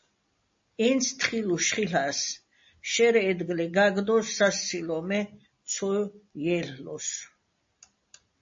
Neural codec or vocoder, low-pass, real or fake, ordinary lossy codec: none; 7.2 kHz; real; MP3, 32 kbps